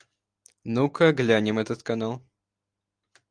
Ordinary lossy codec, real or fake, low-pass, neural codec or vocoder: Opus, 32 kbps; real; 9.9 kHz; none